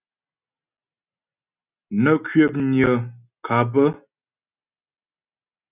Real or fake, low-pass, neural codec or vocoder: real; 3.6 kHz; none